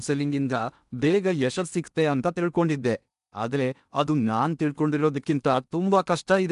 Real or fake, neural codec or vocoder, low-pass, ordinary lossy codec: fake; codec, 16 kHz in and 24 kHz out, 0.8 kbps, FocalCodec, streaming, 65536 codes; 10.8 kHz; none